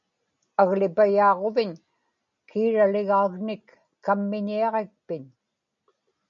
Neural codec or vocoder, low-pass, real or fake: none; 7.2 kHz; real